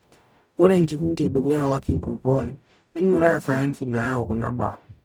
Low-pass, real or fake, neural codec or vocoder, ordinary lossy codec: none; fake; codec, 44.1 kHz, 0.9 kbps, DAC; none